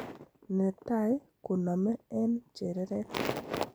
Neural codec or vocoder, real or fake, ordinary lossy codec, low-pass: none; real; none; none